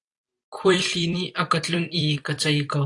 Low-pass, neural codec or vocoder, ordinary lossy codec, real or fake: 10.8 kHz; vocoder, 44.1 kHz, 128 mel bands every 256 samples, BigVGAN v2; AAC, 48 kbps; fake